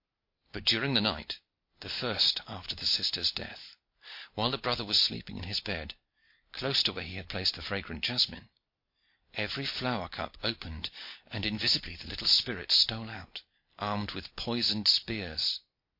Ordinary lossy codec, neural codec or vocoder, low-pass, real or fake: MP3, 32 kbps; none; 5.4 kHz; real